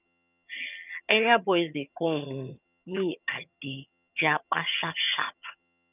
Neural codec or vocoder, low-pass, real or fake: vocoder, 22.05 kHz, 80 mel bands, HiFi-GAN; 3.6 kHz; fake